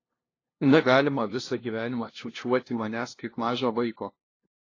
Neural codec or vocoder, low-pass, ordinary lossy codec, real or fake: codec, 16 kHz, 0.5 kbps, FunCodec, trained on LibriTTS, 25 frames a second; 7.2 kHz; AAC, 32 kbps; fake